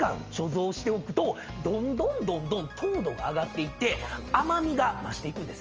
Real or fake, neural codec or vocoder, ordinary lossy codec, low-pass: real; none; Opus, 24 kbps; 7.2 kHz